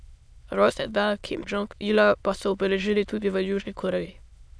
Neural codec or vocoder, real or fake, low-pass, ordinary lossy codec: autoencoder, 22.05 kHz, a latent of 192 numbers a frame, VITS, trained on many speakers; fake; none; none